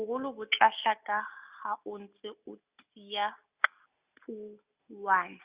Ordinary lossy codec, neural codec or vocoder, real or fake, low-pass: Opus, 64 kbps; none; real; 3.6 kHz